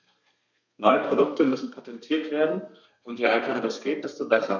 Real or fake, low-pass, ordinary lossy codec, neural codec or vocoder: fake; 7.2 kHz; none; codec, 32 kHz, 1.9 kbps, SNAC